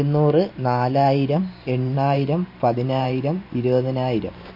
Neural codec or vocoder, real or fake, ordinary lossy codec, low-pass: vocoder, 44.1 kHz, 128 mel bands every 512 samples, BigVGAN v2; fake; MP3, 32 kbps; 5.4 kHz